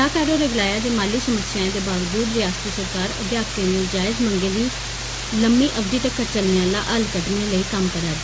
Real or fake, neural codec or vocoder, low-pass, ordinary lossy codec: real; none; none; none